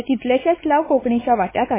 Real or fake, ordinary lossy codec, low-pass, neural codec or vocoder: fake; MP3, 16 kbps; 3.6 kHz; codec, 16 kHz, 4 kbps, X-Codec, WavLM features, trained on Multilingual LibriSpeech